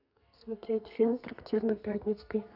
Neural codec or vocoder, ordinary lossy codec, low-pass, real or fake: codec, 32 kHz, 1.9 kbps, SNAC; none; 5.4 kHz; fake